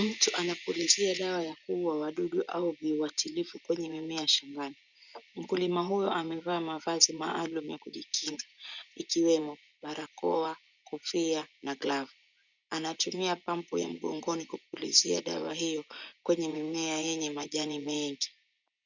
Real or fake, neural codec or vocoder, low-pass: fake; vocoder, 24 kHz, 100 mel bands, Vocos; 7.2 kHz